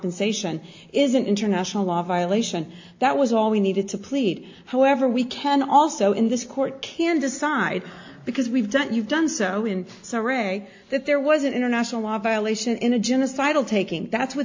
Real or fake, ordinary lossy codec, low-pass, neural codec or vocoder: real; AAC, 48 kbps; 7.2 kHz; none